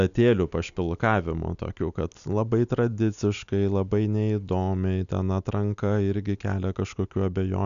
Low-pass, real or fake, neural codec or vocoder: 7.2 kHz; real; none